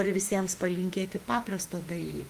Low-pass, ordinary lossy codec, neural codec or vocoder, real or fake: 14.4 kHz; Opus, 32 kbps; autoencoder, 48 kHz, 32 numbers a frame, DAC-VAE, trained on Japanese speech; fake